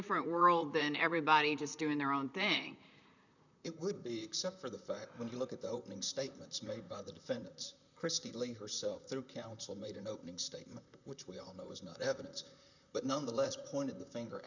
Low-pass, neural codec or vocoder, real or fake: 7.2 kHz; vocoder, 44.1 kHz, 128 mel bands, Pupu-Vocoder; fake